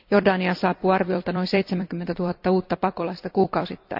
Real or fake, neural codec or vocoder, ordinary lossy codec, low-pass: fake; vocoder, 44.1 kHz, 128 mel bands every 256 samples, BigVGAN v2; none; 5.4 kHz